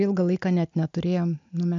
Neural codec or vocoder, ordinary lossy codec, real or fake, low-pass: none; MP3, 64 kbps; real; 7.2 kHz